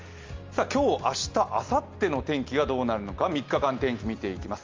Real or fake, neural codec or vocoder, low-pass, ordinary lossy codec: real; none; 7.2 kHz; Opus, 32 kbps